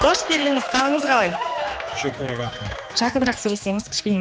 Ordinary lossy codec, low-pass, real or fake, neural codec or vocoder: none; none; fake; codec, 16 kHz, 2 kbps, X-Codec, HuBERT features, trained on general audio